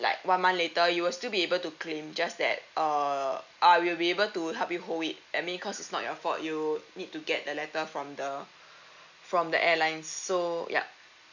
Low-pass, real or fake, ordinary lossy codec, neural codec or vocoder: 7.2 kHz; real; none; none